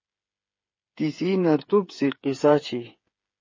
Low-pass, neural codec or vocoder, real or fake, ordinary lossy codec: 7.2 kHz; codec, 16 kHz, 8 kbps, FreqCodec, smaller model; fake; MP3, 32 kbps